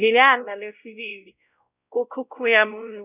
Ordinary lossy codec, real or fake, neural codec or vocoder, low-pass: none; fake; codec, 16 kHz, 0.5 kbps, X-Codec, WavLM features, trained on Multilingual LibriSpeech; 3.6 kHz